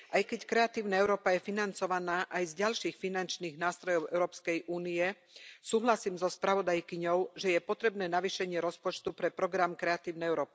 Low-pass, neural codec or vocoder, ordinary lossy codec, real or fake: none; none; none; real